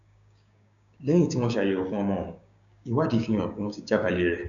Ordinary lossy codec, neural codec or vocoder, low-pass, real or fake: none; codec, 16 kHz, 6 kbps, DAC; 7.2 kHz; fake